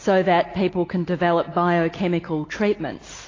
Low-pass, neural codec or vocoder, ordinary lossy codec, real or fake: 7.2 kHz; none; AAC, 32 kbps; real